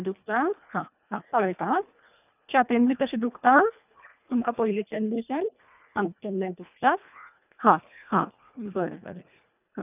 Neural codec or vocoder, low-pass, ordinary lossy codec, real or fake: codec, 24 kHz, 1.5 kbps, HILCodec; 3.6 kHz; none; fake